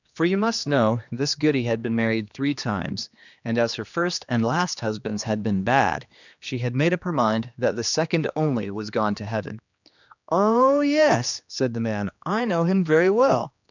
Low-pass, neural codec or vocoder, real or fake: 7.2 kHz; codec, 16 kHz, 2 kbps, X-Codec, HuBERT features, trained on general audio; fake